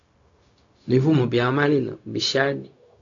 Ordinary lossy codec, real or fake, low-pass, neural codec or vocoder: AAC, 48 kbps; fake; 7.2 kHz; codec, 16 kHz, 0.4 kbps, LongCat-Audio-Codec